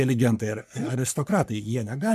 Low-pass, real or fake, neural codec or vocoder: 14.4 kHz; fake; codec, 44.1 kHz, 3.4 kbps, Pupu-Codec